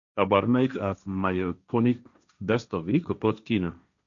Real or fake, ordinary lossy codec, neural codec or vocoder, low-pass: fake; none; codec, 16 kHz, 1.1 kbps, Voila-Tokenizer; 7.2 kHz